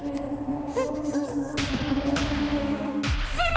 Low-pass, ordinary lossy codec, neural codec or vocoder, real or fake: none; none; codec, 16 kHz, 2 kbps, X-Codec, HuBERT features, trained on balanced general audio; fake